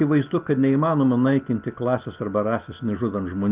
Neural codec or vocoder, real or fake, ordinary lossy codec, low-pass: none; real; Opus, 32 kbps; 3.6 kHz